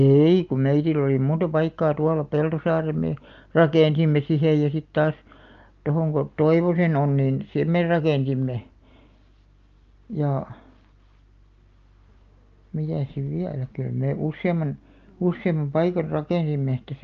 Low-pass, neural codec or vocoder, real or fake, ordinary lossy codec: 7.2 kHz; none; real; Opus, 24 kbps